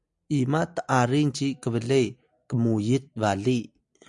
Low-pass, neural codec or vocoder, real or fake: 10.8 kHz; none; real